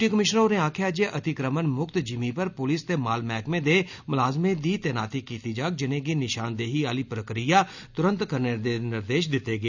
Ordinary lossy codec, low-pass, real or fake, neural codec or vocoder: none; 7.2 kHz; real; none